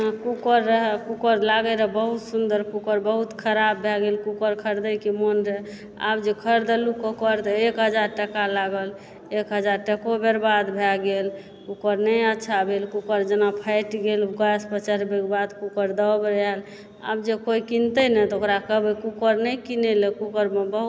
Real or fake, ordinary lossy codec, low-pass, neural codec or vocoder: real; none; none; none